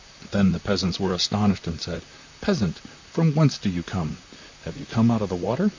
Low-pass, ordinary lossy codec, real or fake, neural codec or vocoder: 7.2 kHz; MP3, 64 kbps; fake; vocoder, 44.1 kHz, 128 mel bands, Pupu-Vocoder